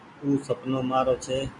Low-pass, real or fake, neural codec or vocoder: 10.8 kHz; real; none